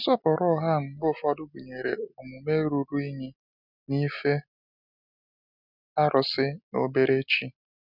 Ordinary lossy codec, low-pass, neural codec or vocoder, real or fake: none; 5.4 kHz; none; real